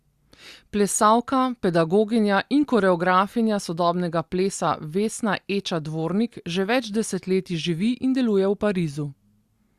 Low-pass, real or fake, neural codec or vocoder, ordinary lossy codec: 14.4 kHz; real; none; Opus, 64 kbps